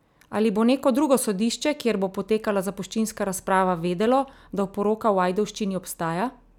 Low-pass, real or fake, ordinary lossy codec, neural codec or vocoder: 19.8 kHz; real; none; none